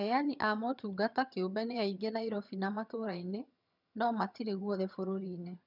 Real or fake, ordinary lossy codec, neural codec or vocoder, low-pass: fake; none; vocoder, 22.05 kHz, 80 mel bands, HiFi-GAN; 5.4 kHz